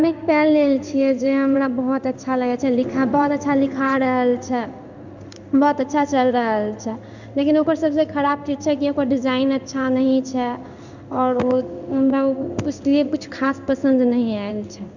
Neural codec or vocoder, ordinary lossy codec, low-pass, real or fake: codec, 16 kHz in and 24 kHz out, 1 kbps, XY-Tokenizer; none; 7.2 kHz; fake